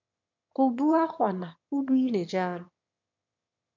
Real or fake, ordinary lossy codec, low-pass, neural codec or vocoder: fake; MP3, 48 kbps; 7.2 kHz; autoencoder, 22.05 kHz, a latent of 192 numbers a frame, VITS, trained on one speaker